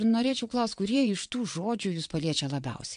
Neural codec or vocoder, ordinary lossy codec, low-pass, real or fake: vocoder, 22.05 kHz, 80 mel bands, WaveNeXt; MP3, 64 kbps; 9.9 kHz; fake